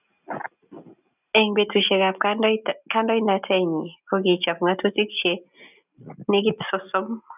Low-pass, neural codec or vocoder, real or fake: 3.6 kHz; none; real